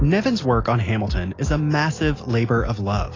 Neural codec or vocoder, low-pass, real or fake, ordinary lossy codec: none; 7.2 kHz; real; AAC, 32 kbps